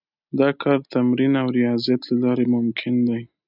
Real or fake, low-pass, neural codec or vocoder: real; 5.4 kHz; none